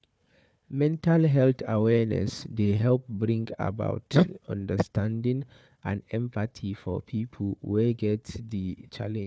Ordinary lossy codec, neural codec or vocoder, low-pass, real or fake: none; codec, 16 kHz, 4 kbps, FunCodec, trained on Chinese and English, 50 frames a second; none; fake